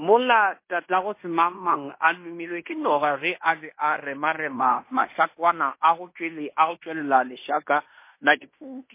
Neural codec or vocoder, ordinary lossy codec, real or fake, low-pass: codec, 16 kHz in and 24 kHz out, 0.9 kbps, LongCat-Audio-Codec, fine tuned four codebook decoder; MP3, 24 kbps; fake; 3.6 kHz